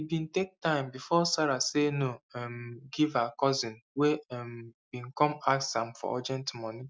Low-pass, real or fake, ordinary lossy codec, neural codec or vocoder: none; real; none; none